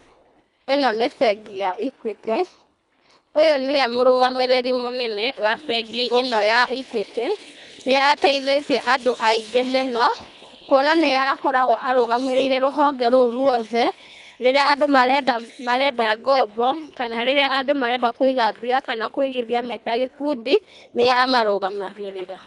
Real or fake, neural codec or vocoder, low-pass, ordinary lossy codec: fake; codec, 24 kHz, 1.5 kbps, HILCodec; 10.8 kHz; none